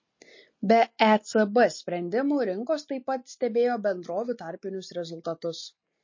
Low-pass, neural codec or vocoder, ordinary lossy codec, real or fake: 7.2 kHz; none; MP3, 32 kbps; real